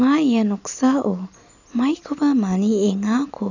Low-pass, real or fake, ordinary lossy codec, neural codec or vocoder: 7.2 kHz; real; none; none